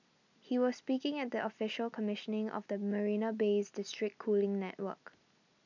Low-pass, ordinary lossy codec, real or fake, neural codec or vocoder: 7.2 kHz; none; real; none